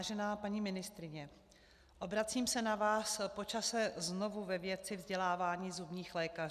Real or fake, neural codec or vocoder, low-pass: real; none; 14.4 kHz